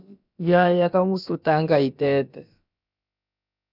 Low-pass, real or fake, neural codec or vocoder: 5.4 kHz; fake; codec, 16 kHz, about 1 kbps, DyCAST, with the encoder's durations